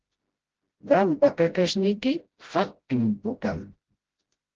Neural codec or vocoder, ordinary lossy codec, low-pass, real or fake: codec, 16 kHz, 0.5 kbps, FreqCodec, smaller model; Opus, 16 kbps; 7.2 kHz; fake